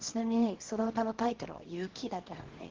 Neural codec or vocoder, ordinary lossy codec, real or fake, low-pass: codec, 24 kHz, 0.9 kbps, WavTokenizer, small release; Opus, 16 kbps; fake; 7.2 kHz